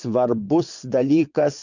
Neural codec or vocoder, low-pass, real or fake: vocoder, 22.05 kHz, 80 mel bands, WaveNeXt; 7.2 kHz; fake